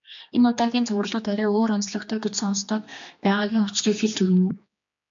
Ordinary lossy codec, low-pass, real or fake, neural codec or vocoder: MP3, 64 kbps; 7.2 kHz; fake; codec, 16 kHz, 2 kbps, X-Codec, HuBERT features, trained on general audio